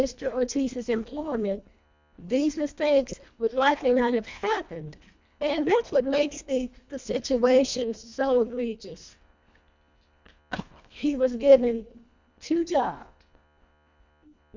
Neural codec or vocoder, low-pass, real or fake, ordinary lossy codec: codec, 24 kHz, 1.5 kbps, HILCodec; 7.2 kHz; fake; MP3, 64 kbps